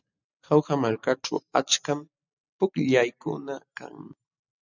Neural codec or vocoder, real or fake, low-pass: none; real; 7.2 kHz